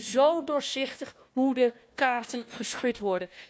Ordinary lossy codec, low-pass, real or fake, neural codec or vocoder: none; none; fake; codec, 16 kHz, 1 kbps, FunCodec, trained on Chinese and English, 50 frames a second